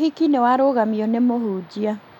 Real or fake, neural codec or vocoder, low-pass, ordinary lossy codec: real; none; 19.8 kHz; none